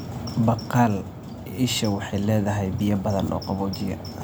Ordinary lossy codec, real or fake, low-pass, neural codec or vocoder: none; real; none; none